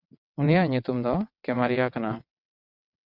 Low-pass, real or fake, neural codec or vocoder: 5.4 kHz; fake; vocoder, 22.05 kHz, 80 mel bands, WaveNeXt